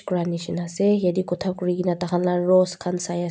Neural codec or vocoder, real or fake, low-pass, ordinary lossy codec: none; real; none; none